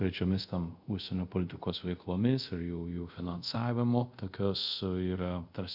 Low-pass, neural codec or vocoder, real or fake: 5.4 kHz; codec, 24 kHz, 0.5 kbps, DualCodec; fake